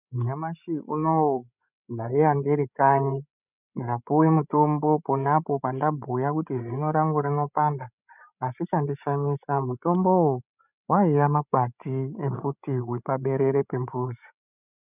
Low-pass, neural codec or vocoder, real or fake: 3.6 kHz; codec, 16 kHz, 16 kbps, FreqCodec, larger model; fake